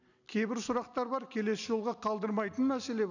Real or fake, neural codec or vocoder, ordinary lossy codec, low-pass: real; none; none; 7.2 kHz